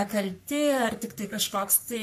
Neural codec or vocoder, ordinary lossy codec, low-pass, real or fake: codec, 44.1 kHz, 3.4 kbps, Pupu-Codec; MP3, 64 kbps; 14.4 kHz; fake